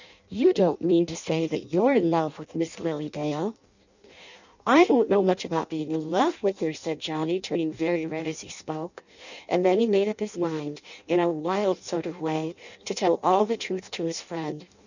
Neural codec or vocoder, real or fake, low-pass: codec, 16 kHz in and 24 kHz out, 0.6 kbps, FireRedTTS-2 codec; fake; 7.2 kHz